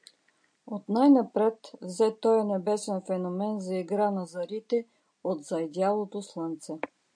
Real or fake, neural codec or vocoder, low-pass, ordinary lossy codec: real; none; 9.9 kHz; AAC, 64 kbps